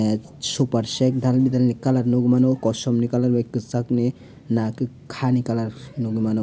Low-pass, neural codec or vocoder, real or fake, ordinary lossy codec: none; none; real; none